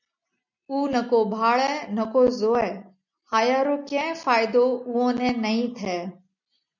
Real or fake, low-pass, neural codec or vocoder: real; 7.2 kHz; none